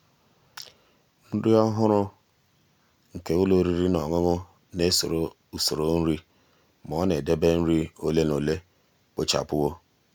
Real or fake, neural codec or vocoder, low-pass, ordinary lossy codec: real; none; none; none